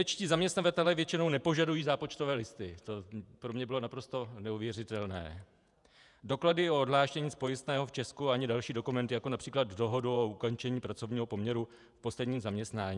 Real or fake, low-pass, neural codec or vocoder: fake; 10.8 kHz; vocoder, 48 kHz, 128 mel bands, Vocos